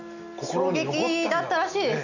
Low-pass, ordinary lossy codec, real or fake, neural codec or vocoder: 7.2 kHz; none; real; none